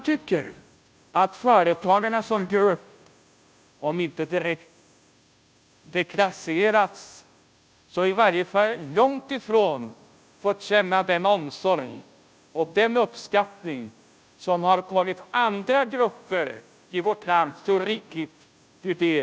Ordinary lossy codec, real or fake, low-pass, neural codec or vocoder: none; fake; none; codec, 16 kHz, 0.5 kbps, FunCodec, trained on Chinese and English, 25 frames a second